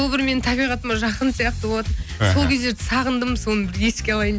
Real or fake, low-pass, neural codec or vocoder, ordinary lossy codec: real; none; none; none